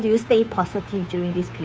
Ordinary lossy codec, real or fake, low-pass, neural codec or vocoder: none; fake; none; codec, 16 kHz, 2 kbps, FunCodec, trained on Chinese and English, 25 frames a second